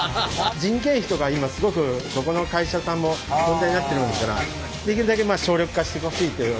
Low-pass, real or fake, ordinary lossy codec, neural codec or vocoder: none; real; none; none